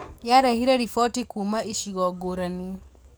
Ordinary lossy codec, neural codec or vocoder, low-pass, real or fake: none; codec, 44.1 kHz, 7.8 kbps, DAC; none; fake